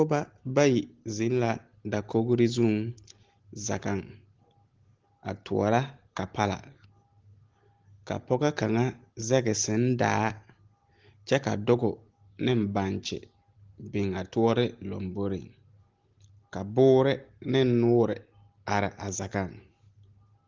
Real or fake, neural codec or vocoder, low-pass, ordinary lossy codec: real; none; 7.2 kHz; Opus, 16 kbps